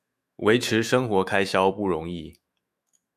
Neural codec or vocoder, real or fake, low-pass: autoencoder, 48 kHz, 128 numbers a frame, DAC-VAE, trained on Japanese speech; fake; 14.4 kHz